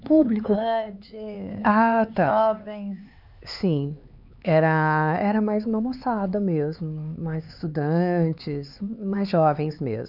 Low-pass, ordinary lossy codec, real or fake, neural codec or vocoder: 5.4 kHz; none; fake; codec, 16 kHz, 4 kbps, X-Codec, HuBERT features, trained on LibriSpeech